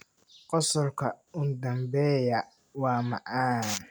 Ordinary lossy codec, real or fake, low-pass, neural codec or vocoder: none; real; none; none